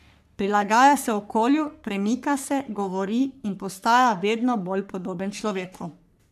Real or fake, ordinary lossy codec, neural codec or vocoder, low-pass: fake; none; codec, 44.1 kHz, 3.4 kbps, Pupu-Codec; 14.4 kHz